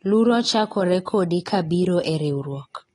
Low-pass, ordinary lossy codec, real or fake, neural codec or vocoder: 10.8 kHz; AAC, 32 kbps; real; none